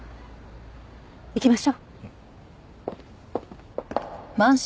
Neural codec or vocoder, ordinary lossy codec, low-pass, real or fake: none; none; none; real